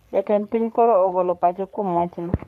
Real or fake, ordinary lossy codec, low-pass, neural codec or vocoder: fake; none; 14.4 kHz; codec, 44.1 kHz, 3.4 kbps, Pupu-Codec